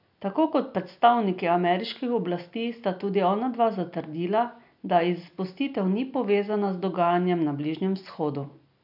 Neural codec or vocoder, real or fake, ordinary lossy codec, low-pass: none; real; none; 5.4 kHz